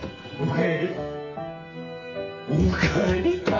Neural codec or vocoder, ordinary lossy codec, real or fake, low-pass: codec, 44.1 kHz, 2.6 kbps, SNAC; MP3, 32 kbps; fake; 7.2 kHz